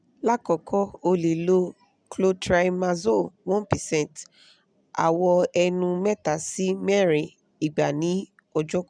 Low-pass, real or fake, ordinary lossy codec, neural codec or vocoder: 9.9 kHz; real; none; none